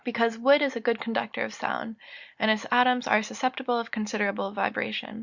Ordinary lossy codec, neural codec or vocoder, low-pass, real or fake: Opus, 64 kbps; none; 7.2 kHz; real